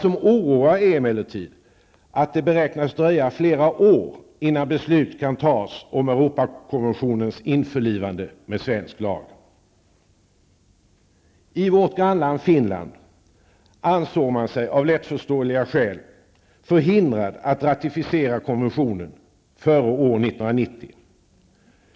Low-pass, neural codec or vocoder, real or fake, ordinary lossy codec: none; none; real; none